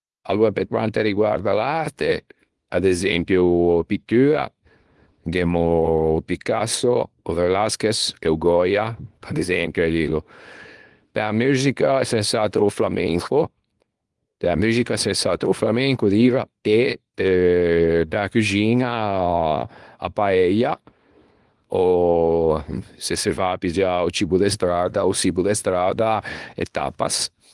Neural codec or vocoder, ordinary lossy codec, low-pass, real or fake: codec, 24 kHz, 0.9 kbps, WavTokenizer, small release; Opus, 24 kbps; 10.8 kHz; fake